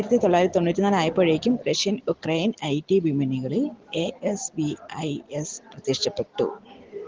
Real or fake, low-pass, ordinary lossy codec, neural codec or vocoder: real; 7.2 kHz; Opus, 16 kbps; none